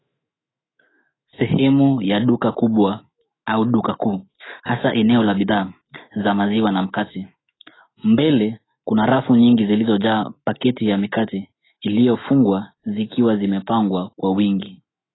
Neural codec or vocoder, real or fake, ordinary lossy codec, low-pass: autoencoder, 48 kHz, 128 numbers a frame, DAC-VAE, trained on Japanese speech; fake; AAC, 16 kbps; 7.2 kHz